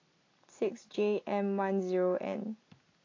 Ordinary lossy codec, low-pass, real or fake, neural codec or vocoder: AAC, 32 kbps; 7.2 kHz; real; none